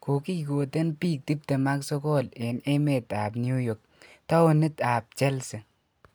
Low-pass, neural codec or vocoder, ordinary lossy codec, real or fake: none; none; none; real